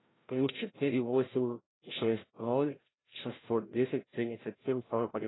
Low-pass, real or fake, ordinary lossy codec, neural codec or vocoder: 7.2 kHz; fake; AAC, 16 kbps; codec, 16 kHz, 0.5 kbps, FreqCodec, larger model